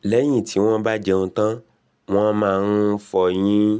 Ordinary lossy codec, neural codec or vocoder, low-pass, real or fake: none; none; none; real